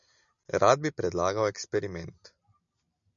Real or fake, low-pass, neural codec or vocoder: real; 7.2 kHz; none